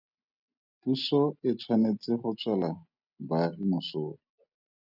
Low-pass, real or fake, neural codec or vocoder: 5.4 kHz; real; none